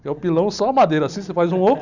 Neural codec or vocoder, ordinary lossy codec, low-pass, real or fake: none; none; 7.2 kHz; real